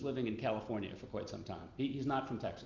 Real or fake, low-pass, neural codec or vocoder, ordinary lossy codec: real; 7.2 kHz; none; Opus, 32 kbps